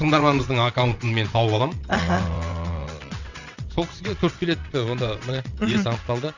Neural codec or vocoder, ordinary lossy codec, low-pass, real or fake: vocoder, 22.05 kHz, 80 mel bands, WaveNeXt; none; 7.2 kHz; fake